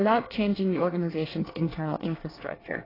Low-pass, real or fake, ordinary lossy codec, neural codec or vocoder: 5.4 kHz; fake; AAC, 24 kbps; codec, 24 kHz, 1 kbps, SNAC